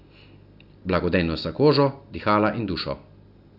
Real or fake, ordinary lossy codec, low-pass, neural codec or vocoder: real; none; 5.4 kHz; none